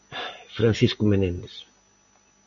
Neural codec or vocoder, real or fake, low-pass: none; real; 7.2 kHz